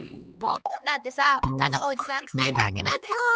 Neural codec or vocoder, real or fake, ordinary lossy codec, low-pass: codec, 16 kHz, 2 kbps, X-Codec, HuBERT features, trained on LibriSpeech; fake; none; none